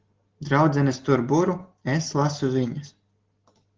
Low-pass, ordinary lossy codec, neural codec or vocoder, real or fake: 7.2 kHz; Opus, 16 kbps; none; real